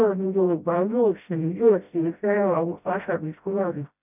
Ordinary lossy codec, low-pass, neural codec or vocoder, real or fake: none; 3.6 kHz; codec, 16 kHz, 0.5 kbps, FreqCodec, smaller model; fake